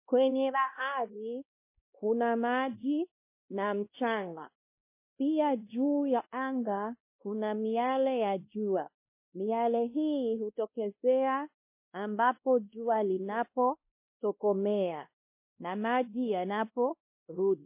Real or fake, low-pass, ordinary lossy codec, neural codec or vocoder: fake; 3.6 kHz; MP3, 24 kbps; codec, 16 kHz, 1 kbps, X-Codec, WavLM features, trained on Multilingual LibriSpeech